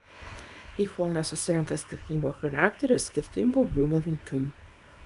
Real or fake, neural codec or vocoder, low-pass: fake; codec, 24 kHz, 0.9 kbps, WavTokenizer, small release; 10.8 kHz